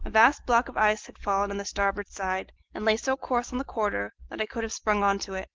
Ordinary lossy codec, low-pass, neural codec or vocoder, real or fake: Opus, 16 kbps; 7.2 kHz; none; real